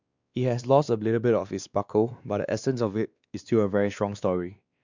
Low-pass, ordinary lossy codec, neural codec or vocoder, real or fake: 7.2 kHz; Opus, 64 kbps; codec, 16 kHz, 2 kbps, X-Codec, WavLM features, trained on Multilingual LibriSpeech; fake